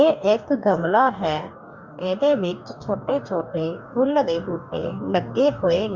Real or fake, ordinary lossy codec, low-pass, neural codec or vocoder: fake; Opus, 64 kbps; 7.2 kHz; codec, 44.1 kHz, 2.6 kbps, DAC